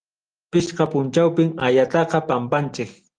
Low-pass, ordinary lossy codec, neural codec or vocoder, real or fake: 9.9 kHz; Opus, 24 kbps; none; real